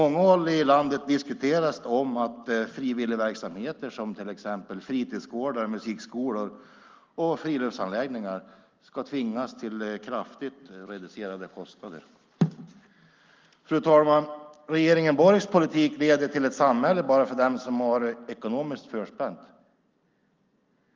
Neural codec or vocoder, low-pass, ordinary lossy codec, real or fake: none; 7.2 kHz; Opus, 24 kbps; real